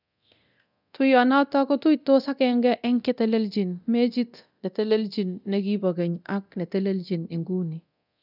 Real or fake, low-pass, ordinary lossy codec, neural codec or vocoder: fake; 5.4 kHz; none; codec, 24 kHz, 0.9 kbps, DualCodec